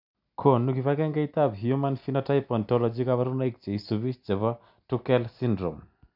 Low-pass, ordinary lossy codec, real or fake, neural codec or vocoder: 5.4 kHz; none; real; none